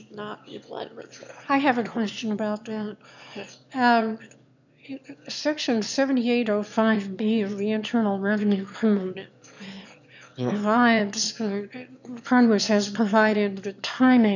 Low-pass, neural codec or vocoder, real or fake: 7.2 kHz; autoencoder, 22.05 kHz, a latent of 192 numbers a frame, VITS, trained on one speaker; fake